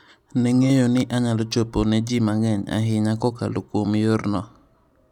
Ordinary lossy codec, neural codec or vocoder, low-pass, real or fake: none; none; 19.8 kHz; real